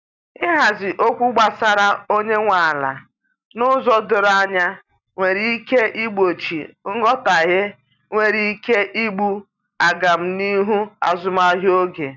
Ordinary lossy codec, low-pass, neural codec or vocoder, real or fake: none; 7.2 kHz; none; real